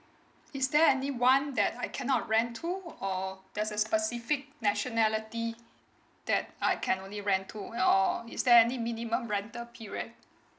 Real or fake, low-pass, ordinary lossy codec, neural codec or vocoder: real; none; none; none